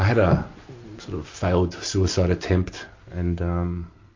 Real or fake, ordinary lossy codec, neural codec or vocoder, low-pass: real; MP3, 48 kbps; none; 7.2 kHz